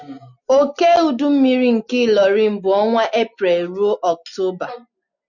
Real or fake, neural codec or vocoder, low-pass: real; none; 7.2 kHz